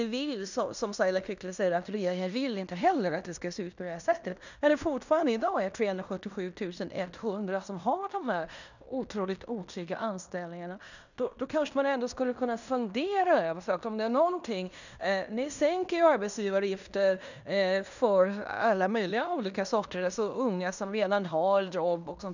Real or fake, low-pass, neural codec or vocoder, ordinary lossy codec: fake; 7.2 kHz; codec, 16 kHz in and 24 kHz out, 0.9 kbps, LongCat-Audio-Codec, fine tuned four codebook decoder; none